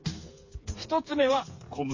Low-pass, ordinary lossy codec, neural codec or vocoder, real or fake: 7.2 kHz; MP3, 32 kbps; codec, 16 kHz, 4 kbps, FreqCodec, smaller model; fake